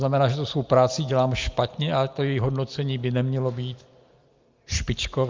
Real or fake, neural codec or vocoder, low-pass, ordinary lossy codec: real; none; 7.2 kHz; Opus, 24 kbps